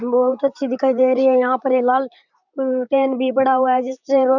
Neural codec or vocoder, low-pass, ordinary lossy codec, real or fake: vocoder, 44.1 kHz, 128 mel bands, Pupu-Vocoder; 7.2 kHz; none; fake